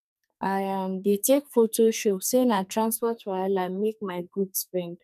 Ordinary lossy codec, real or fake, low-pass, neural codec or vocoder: none; fake; 14.4 kHz; codec, 44.1 kHz, 2.6 kbps, SNAC